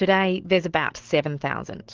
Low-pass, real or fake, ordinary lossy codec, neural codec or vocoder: 7.2 kHz; real; Opus, 16 kbps; none